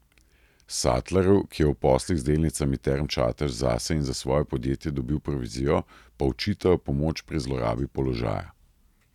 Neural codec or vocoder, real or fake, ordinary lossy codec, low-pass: none; real; none; 19.8 kHz